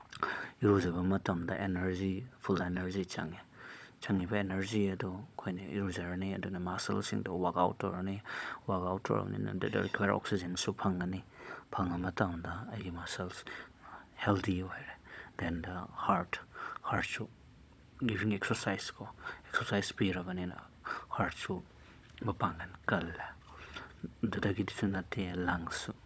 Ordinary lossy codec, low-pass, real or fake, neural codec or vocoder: none; none; fake; codec, 16 kHz, 16 kbps, FunCodec, trained on Chinese and English, 50 frames a second